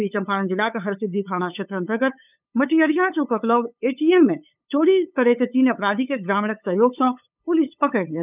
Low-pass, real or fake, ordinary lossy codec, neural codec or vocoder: 3.6 kHz; fake; none; codec, 16 kHz, 8 kbps, FunCodec, trained on LibriTTS, 25 frames a second